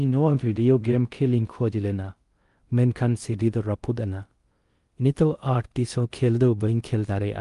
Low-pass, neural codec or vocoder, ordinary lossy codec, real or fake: 10.8 kHz; codec, 16 kHz in and 24 kHz out, 0.6 kbps, FocalCodec, streaming, 2048 codes; Opus, 32 kbps; fake